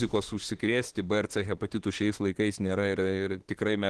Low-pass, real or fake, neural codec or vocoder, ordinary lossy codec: 10.8 kHz; fake; autoencoder, 48 kHz, 32 numbers a frame, DAC-VAE, trained on Japanese speech; Opus, 16 kbps